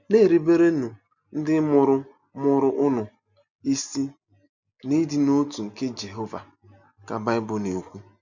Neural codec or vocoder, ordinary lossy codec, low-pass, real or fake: none; none; 7.2 kHz; real